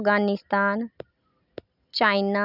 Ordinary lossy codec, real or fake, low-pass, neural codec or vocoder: none; real; 5.4 kHz; none